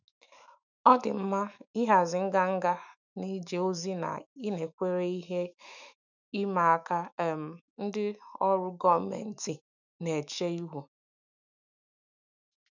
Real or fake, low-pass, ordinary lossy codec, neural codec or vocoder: fake; 7.2 kHz; none; autoencoder, 48 kHz, 128 numbers a frame, DAC-VAE, trained on Japanese speech